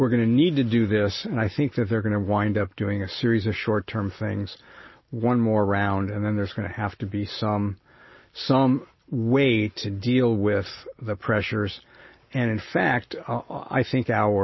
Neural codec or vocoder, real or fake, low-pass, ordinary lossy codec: none; real; 7.2 kHz; MP3, 24 kbps